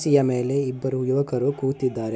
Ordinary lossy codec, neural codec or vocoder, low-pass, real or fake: none; none; none; real